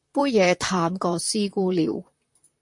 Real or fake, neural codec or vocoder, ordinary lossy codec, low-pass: fake; vocoder, 44.1 kHz, 128 mel bands, Pupu-Vocoder; MP3, 48 kbps; 10.8 kHz